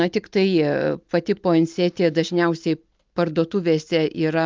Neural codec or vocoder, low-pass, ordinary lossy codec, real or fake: none; 7.2 kHz; Opus, 32 kbps; real